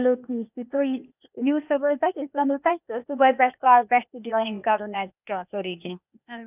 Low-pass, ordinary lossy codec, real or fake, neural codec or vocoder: 3.6 kHz; none; fake; codec, 16 kHz, 0.8 kbps, ZipCodec